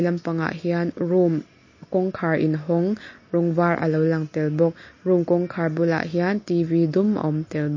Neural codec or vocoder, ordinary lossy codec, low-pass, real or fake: none; MP3, 32 kbps; 7.2 kHz; real